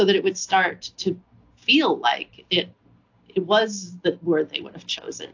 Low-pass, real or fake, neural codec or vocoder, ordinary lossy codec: 7.2 kHz; real; none; AAC, 48 kbps